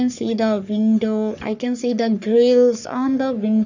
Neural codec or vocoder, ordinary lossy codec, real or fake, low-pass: codec, 44.1 kHz, 3.4 kbps, Pupu-Codec; none; fake; 7.2 kHz